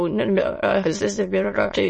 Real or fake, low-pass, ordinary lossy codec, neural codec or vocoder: fake; 9.9 kHz; MP3, 32 kbps; autoencoder, 22.05 kHz, a latent of 192 numbers a frame, VITS, trained on many speakers